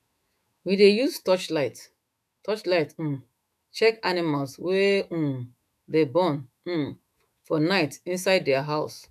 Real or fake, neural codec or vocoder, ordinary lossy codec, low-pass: fake; autoencoder, 48 kHz, 128 numbers a frame, DAC-VAE, trained on Japanese speech; none; 14.4 kHz